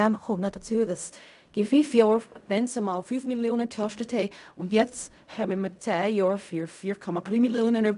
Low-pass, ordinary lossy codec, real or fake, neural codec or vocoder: 10.8 kHz; none; fake; codec, 16 kHz in and 24 kHz out, 0.4 kbps, LongCat-Audio-Codec, fine tuned four codebook decoder